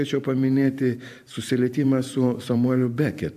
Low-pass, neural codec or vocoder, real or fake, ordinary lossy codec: 14.4 kHz; vocoder, 44.1 kHz, 128 mel bands every 512 samples, BigVGAN v2; fake; AAC, 96 kbps